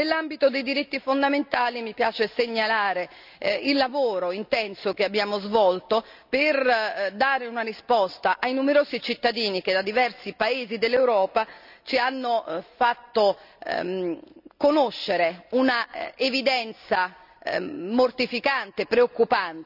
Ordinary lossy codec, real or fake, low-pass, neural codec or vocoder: none; real; 5.4 kHz; none